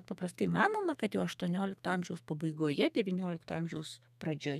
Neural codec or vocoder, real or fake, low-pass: codec, 32 kHz, 1.9 kbps, SNAC; fake; 14.4 kHz